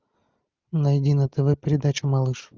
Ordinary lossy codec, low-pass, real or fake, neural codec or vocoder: Opus, 24 kbps; 7.2 kHz; real; none